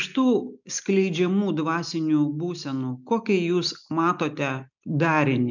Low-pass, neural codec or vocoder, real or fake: 7.2 kHz; none; real